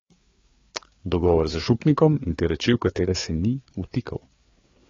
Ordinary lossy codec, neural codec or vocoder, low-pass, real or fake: AAC, 32 kbps; codec, 16 kHz, 4 kbps, FreqCodec, larger model; 7.2 kHz; fake